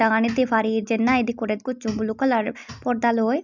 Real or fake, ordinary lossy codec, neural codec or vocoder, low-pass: real; none; none; 7.2 kHz